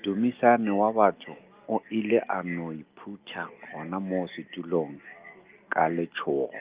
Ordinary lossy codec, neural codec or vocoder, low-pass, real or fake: Opus, 32 kbps; none; 3.6 kHz; real